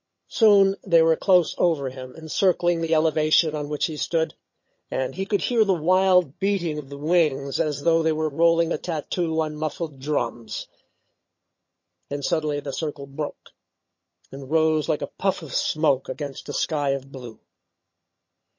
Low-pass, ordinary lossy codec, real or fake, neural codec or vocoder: 7.2 kHz; MP3, 32 kbps; fake; vocoder, 22.05 kHz, 80 mel bands, HiFi-GAN